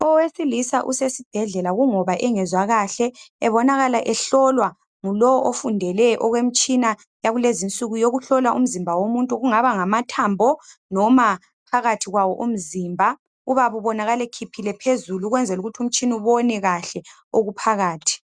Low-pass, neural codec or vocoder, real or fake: 9.9 kHz; none; real